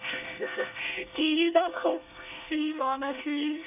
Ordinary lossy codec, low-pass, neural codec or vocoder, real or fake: none; 3.6 kHz; codec, 24 kHz, 1 kbps, SNAC; fake